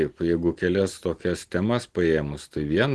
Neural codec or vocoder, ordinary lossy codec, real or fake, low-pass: none; Opus, 16 kbps; real; 10.8 kHz